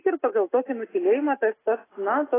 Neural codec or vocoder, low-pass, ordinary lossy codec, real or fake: none; 3.6 kHz; AAC, 16 kbps; real